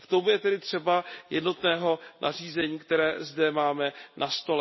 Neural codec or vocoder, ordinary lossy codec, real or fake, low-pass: none; MP3, 24 kbps; real; 7.2 kHz